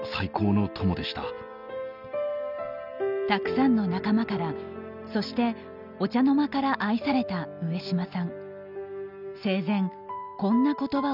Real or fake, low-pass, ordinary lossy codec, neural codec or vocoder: real; 5.4 kHz; none; none